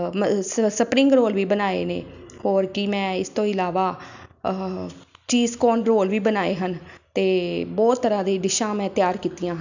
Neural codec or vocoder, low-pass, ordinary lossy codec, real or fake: none; 7.2 kHz; none; real